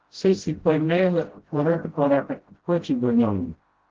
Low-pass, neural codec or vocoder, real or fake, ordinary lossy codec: 7.2 kHz; codec, 16 kHz, 0.5 kbps, FreqCodec, smaller model; fake; Opus, 16 kbps